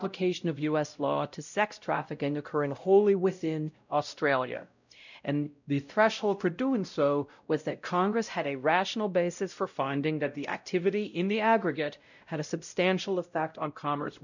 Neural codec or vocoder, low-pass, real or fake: codec, 16 kHz, 0.5 kbps, X-Codec, WavLM features, trained on Multilingual LibriSpeech; 7.2 kHz; fake